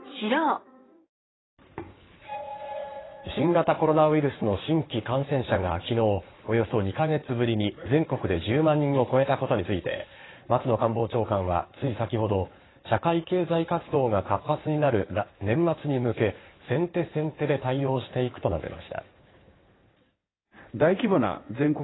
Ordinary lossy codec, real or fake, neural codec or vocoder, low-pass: AAC, 16 kbps; fake; codec, 16 kHz in and 24 kHz out, 2.2 kbps, FireRedTTS-2 codec; 7.2 kHz